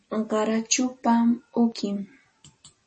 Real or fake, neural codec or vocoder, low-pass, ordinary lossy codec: real; none; 10.8 kHz; MP3, 32 kbps